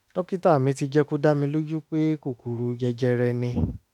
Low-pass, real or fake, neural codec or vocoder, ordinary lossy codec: 19.8 kHz; fake; autoencoder, 48 kHz, 32 numbers a frame, DAC-VAE, trained on Japanese speech; none